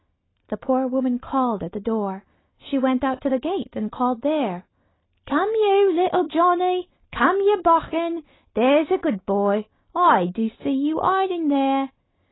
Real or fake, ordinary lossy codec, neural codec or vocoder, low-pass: real; AAC, 16 kbps; none; 7.2 kHz